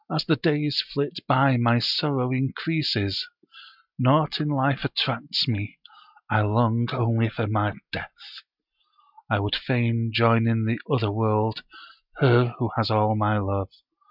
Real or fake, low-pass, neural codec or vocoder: real; 5.4 kHz; none